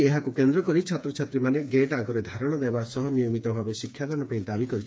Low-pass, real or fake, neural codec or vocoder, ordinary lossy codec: none; fake; codec, 16 kHz, 4 kbps, FreqCodec, smaller model; none